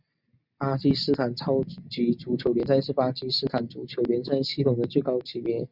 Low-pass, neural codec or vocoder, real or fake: 5.4 kHz; none; real